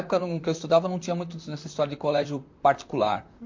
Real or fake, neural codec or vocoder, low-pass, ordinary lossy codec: fake; vocoder, 44.1 kHz, 128 mel bands, Pupu-Vocoder; 7.2 kHz; MP3, 48 kbps